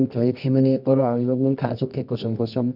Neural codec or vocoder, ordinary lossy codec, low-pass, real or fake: codec, 24 kHz, 0.9 kbps, WavTokenizer, medium music audio release; none; 5.4 kHz; fake